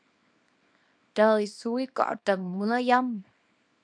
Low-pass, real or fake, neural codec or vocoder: 9.9 kHz; fake; codec, 24 kHz, 0.9 kbps, WavTokenizer, small release